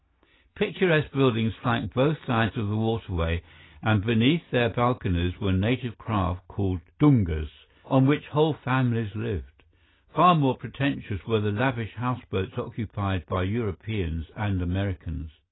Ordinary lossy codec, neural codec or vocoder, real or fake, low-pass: AAC, 16 kbps; none; real; 7.2 kHz